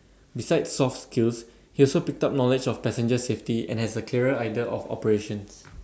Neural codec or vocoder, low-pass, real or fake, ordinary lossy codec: none; none; real; none